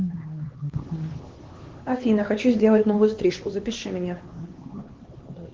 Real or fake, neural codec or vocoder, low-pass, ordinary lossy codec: fake; codec, 16 kHz, 2 kbps, X-Codec, HuBERT features, trained on LibriSpeech; 7.2 kHz; Opus, 16 kbps